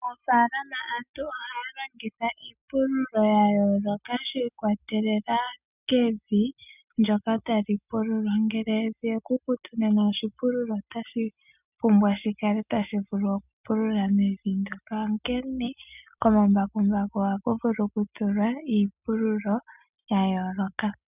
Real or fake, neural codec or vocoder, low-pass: real; none; 3.6 kHz